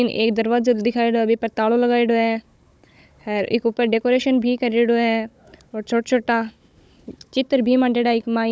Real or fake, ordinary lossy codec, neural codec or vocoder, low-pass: fake; none; codec, 16 kHz, 16 kbps, FunCodec, trained on Chinese and English, 50 frames a second; none